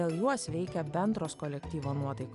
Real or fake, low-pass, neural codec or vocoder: real; 10.8 kHz; none